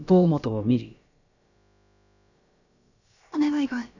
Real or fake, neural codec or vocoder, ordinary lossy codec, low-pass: fake; codec, 16 kHz, about 1 kbps, DyCAST, with the encoder's durations; none; 7.2 kHz